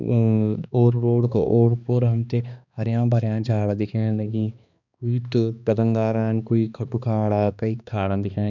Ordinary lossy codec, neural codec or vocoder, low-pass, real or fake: none; codec, 16 kHz, 2 kbps, X-Codec, HuBERT features, trained on balanced general audio; 7.2 kHz; fake